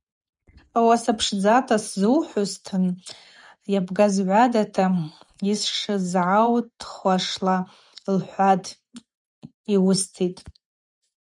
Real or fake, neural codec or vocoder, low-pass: fake; vocoder, 24 kHz, 100 mel bands, Vocos; 10.8 kHz